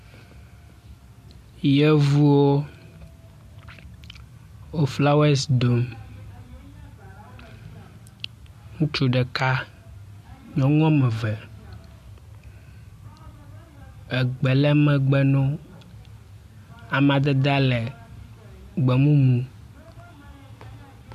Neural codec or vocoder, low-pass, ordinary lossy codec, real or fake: none; 14.4 kHz; MP3, 64 kbps; real